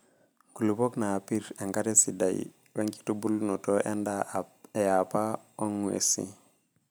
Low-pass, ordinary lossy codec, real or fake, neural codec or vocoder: none; none; real; none